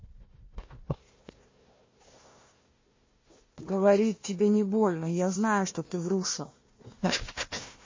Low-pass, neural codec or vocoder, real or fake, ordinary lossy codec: 7.2 kHz; codec, 16 kHz, 1 kbps, FunCodec, trained on Chinese and English, 50 frames a second; fake; MP3, 32 kbps